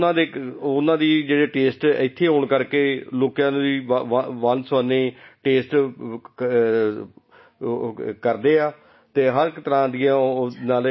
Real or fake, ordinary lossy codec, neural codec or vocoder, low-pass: real; MP3, 24 kbps; none; 7.2 kHz